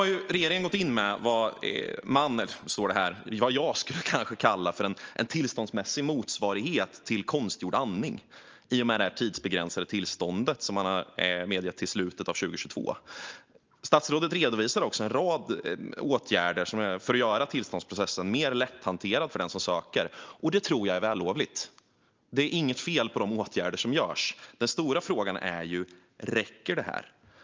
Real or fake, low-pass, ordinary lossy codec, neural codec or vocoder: real; 7.2 kHz; Opus, 24 kbps; none